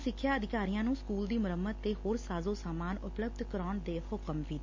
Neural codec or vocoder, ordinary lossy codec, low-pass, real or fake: none; MP3, 48 kbps; 7.2 kHz; real